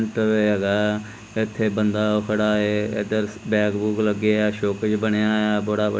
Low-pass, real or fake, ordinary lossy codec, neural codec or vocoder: none; real; none; none